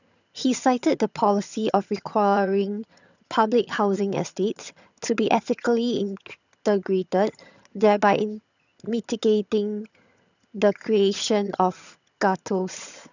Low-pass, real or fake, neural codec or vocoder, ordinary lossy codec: 7.2 kHz; fake; vocoder, 22.05 kHz, 80 mel bands, HiFi-GAN; none